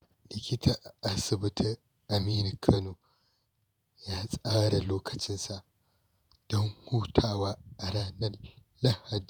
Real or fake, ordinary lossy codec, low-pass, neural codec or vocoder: fake; none; none; vocoder, 48 kHz, 128 mel bands, Vocos